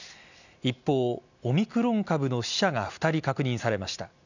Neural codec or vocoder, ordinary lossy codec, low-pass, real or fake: none; none; 7.2 kHz; real